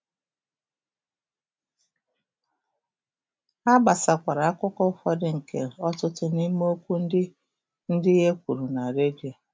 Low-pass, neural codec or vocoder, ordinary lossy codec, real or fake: none; none; none; real